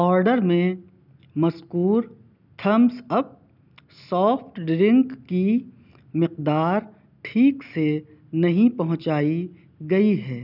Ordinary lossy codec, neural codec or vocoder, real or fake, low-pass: none; none; real; 5.4 kHz